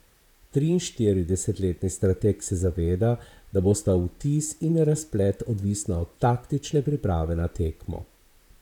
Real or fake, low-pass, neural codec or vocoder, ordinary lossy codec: fake; 19.8 kHz; vocoder, 44.1 kHz, 128 mel bands, Pupu-Vocoder; none